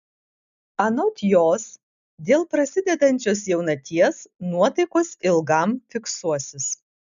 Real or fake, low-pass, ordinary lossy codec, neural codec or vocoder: real; 7.2 kHz; MP3, 96 kbps; none